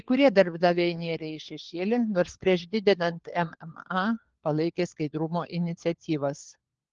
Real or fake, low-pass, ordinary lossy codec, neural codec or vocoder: fake; 7.2 kHz; Opus, 16 kbps; codec, 16 kHz, 4 kbps, FunCodec, trained on LibriTTS, 50 frames a second